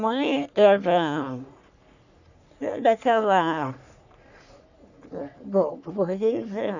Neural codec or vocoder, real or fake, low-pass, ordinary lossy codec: codec, 44.1 kHz, 3.4 kbps, Pupu-Codec; fake; 7.2 kHz; none